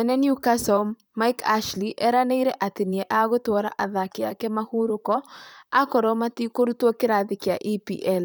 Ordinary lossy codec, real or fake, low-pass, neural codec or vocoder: none; fake; none; vocoder, 44.1 kHz, 128 mel bands, Pupu-Vocoder